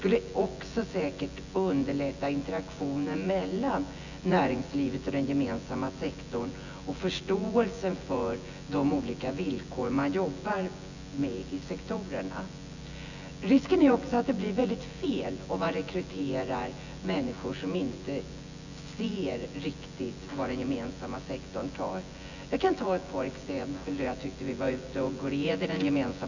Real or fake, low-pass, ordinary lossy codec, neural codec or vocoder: fake; 7.2 kHz; none; vocoder, 24 kHz, 100 mel bands, Vocos